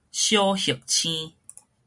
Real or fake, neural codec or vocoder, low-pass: real; none; 10.8 kHz